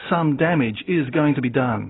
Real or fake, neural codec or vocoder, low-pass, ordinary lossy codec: real; none; 7.2 kHz; AAC, 16 kbps